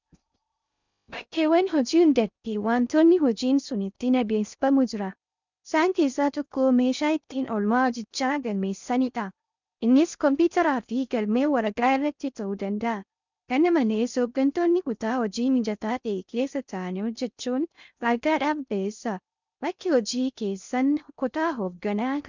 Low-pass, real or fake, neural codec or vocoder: 7.2 kHz; fake; codec, 16 kHz in and 24 kHz out, 0.6 kbps, FocalCodec, streaming, 4096 codes